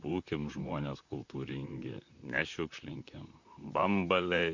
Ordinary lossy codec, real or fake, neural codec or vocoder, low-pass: MP3, 48 kbps; fake; vocoder, 44.1 kHz, 128 mel bands, Pupu-Vocoder; 7.2 kHz